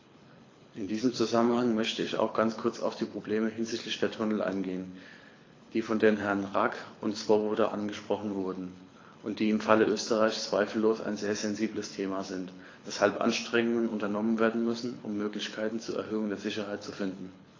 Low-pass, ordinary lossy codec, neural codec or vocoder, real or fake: 7.2 kHz; AAC, 32 kbps; codec, 24 kHz, 6 kbps, HILCodec; fake